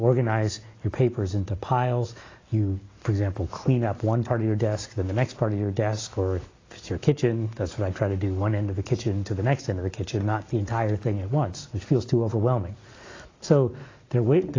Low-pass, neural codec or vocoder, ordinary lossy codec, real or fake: 7.2 kHz; none; AAC, 32 kbps; real